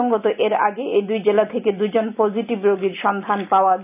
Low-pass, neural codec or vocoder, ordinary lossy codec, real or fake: 3.6 kHz; none; none; real